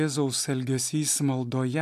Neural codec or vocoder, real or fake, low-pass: none; real; 14.4 kHz